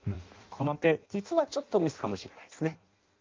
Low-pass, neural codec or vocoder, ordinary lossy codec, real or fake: 7.2 kHz; codec, 16 kHz in and 24 kHz out, 0.6 kbps, FireRedTTS-2 codec; Opus, 24 kbps; fake